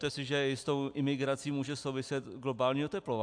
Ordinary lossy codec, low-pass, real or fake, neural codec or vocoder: AAC, 64 kbps; 9.9 kHz; real; none